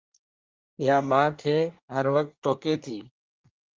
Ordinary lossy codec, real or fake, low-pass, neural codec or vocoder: Opus, 32 kbps; fake; 7.2 kHz; codec, 24 kHz, 1 kbps, SNAC